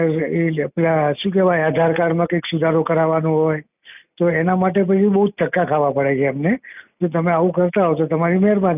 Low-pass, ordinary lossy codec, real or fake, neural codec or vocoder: 3.6 kHz; none; real; none